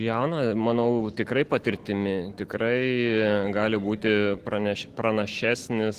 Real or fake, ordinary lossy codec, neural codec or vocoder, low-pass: fake; Opus, 16 kbps; autoencoder, 48 kHz, 128 numbers a frame, DAC-VAE, trained on Japanese speech; 14.4 kHz